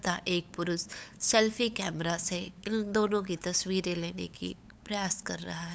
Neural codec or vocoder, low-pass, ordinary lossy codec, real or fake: codec, 16 kHz, 8 kbps, FunCodec, trained on LibriTTS, 25 frames a second; none; none; fake